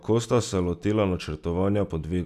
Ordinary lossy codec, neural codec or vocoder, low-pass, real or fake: none; none; 14.4 kHz; real